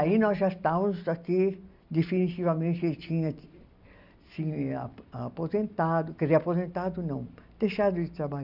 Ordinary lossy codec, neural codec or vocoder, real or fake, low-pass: none; none; real; 5.4 kHz